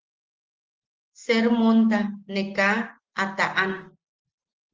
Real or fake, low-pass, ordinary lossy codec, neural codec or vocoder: real; 7.2 kHz; Opus, 16 kbps; none